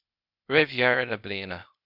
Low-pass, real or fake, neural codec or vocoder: 5.4 kHz; fake; codec, 16 kHz, 0.8 kbps, ZipCodec